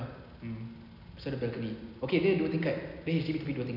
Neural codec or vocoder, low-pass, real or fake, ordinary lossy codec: none; 5.4 kHz; real; none